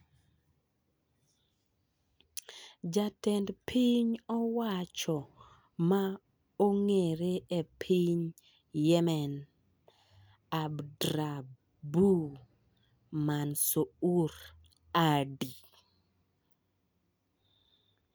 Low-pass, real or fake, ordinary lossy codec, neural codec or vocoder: none; real; none; none